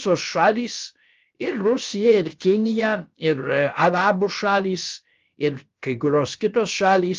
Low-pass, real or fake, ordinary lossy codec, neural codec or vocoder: 7.2 kHz; fake; Opus, 32 kbps; codec, 16 kHz, about 1 kbps, DyCAST, with the encoder's durations